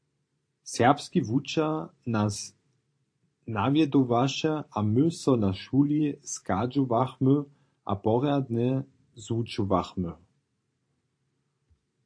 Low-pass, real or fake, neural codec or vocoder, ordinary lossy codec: 9.9 kHz; fake; vocoder, 44.1 kHz, 128 mel bands every 512 samples, BigVGAN v2; AAC, 48 kbps